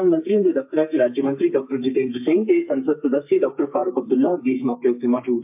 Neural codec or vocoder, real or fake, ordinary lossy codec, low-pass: codec, 32 kHz, 1.9 kbps, SNAC; fake; MP3, 32 kbps; 3.6 kHz